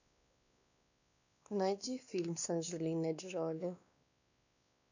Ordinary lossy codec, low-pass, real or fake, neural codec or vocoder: none; 7.2 kHz; fake; codec, 16 kHz, 2 kbps, X-Codec, WavLM features, trained on Multilingual LibriSpeech